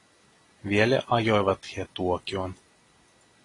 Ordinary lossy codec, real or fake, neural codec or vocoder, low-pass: AAC, 32 kbps; real; none; 10.8 kHz